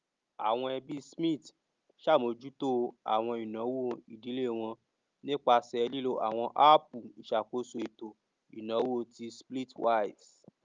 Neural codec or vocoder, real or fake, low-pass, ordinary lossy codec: none; real; 7.2 kHz; Opus, 32 kbps